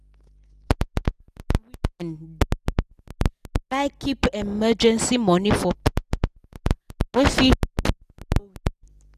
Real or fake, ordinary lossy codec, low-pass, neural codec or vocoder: real; none; 14.4 kHz; none